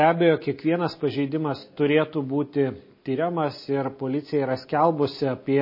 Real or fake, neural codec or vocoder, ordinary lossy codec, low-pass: real; none; MP3, 24 kbps; 5.4 kHz